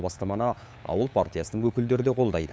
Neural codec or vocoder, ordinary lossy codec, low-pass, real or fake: codec, 16 kHz, 8 kbps, FunCodec, trained on LibriTTS, 25 frames a second; none; none; fake